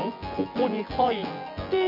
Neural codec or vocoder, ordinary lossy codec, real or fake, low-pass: vocoder, 24 kHz, 100 mel bands, Vocos; none; fake; 5.4 kHz